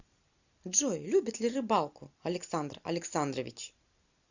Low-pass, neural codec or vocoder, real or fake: 7.2 kHz; none; real